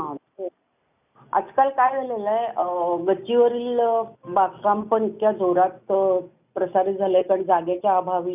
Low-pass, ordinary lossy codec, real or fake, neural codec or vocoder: 3.6 kHz; none; real; none